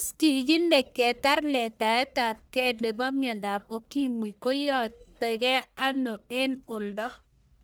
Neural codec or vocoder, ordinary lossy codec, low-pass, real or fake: codec, 44.1 kHz, 1.7 kbps, Pupu-Codec; none; none; fake